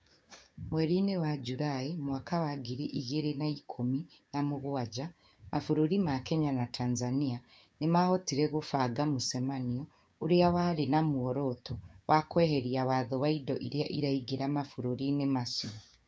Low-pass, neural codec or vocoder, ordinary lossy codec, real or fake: none; codec, 16 kHz, 6 kbps, DAC; none; fake